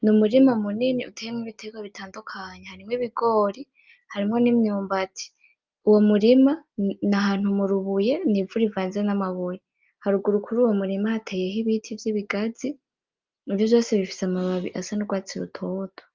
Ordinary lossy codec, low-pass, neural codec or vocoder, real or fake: Opus, 32 kbps; 7.2 kHz; none; real